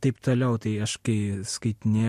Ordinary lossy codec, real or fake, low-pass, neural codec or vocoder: MP3, 64 kbps; real; 14.4 kHz; none